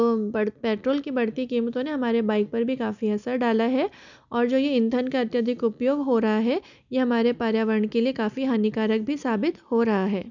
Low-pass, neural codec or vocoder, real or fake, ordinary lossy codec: 7.2 kHz; none; real; none